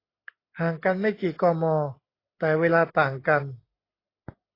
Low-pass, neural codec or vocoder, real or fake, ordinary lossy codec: 5.4 kHz; none; real; AAC, 24 kbps